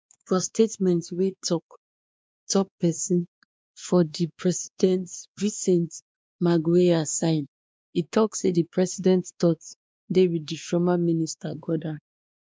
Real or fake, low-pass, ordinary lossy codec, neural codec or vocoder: fake; none; none; codec, 16 kHz, 2 kbps, X-Codec, WavLM features, trained on Multilingual LibriSpeech